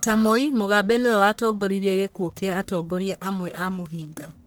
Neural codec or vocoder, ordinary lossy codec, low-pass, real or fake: codec, 44.1 kHz, 1.7 kbps, Pupu-Codec; none; none; fake